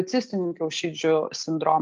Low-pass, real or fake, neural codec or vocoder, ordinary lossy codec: 7.2 kHz; real; none; Opus, 32 kbps